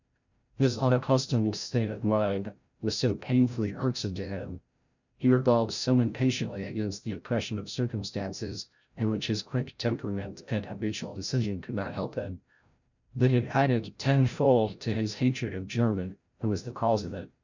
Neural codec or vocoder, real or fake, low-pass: codec, 16 kHz, 0.5 kbps, FreqCodec, larger model; fake; 7.2 kHz